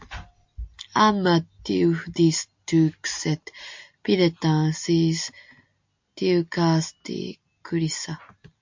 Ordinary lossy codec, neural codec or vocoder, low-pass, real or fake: MP3, 48 kbps; none; 7.2 kHz; real